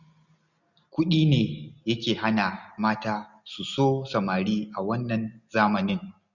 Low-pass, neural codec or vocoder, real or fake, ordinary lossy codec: 7.2 kHz; none; real; none